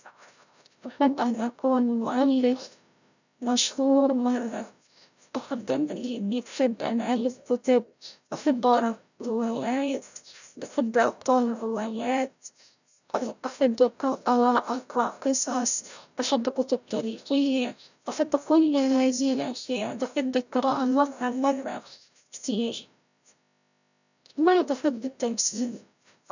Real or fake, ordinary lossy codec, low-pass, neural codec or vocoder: fake; none; 7.2 kHz; codec, 16 kHz, 0.5 kbps, FreqCodec, larger model